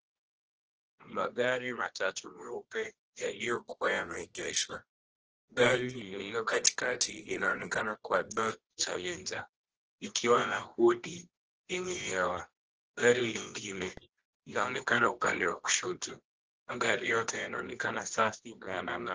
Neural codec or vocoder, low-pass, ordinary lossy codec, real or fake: codec, 24 kHz, 0.9 kbps, WavTokenizer, medium music audio release; 7.2 kHz; Opus, 16 kbps; fake